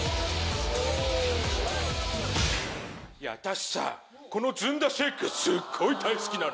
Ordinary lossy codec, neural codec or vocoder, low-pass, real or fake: none; none; none; real